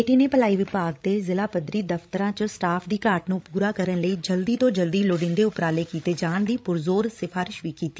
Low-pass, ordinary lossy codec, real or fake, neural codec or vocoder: none; none; fake; codec, 16 kHz, 16 kbps, FreqCodec, larger model